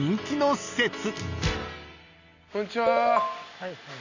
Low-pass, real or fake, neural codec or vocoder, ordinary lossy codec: 7.2 kHz; real; none; none